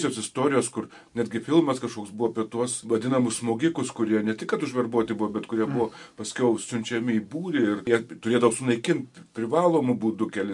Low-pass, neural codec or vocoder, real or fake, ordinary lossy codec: 10.8 kHz; none; real; MP3, 64 kbps